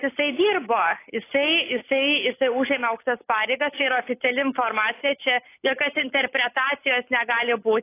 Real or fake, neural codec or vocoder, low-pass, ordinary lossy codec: real; none; 3.6 kHz; AAC, 24 kbps